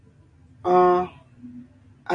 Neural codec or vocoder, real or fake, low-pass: none; real; 9.9 kHz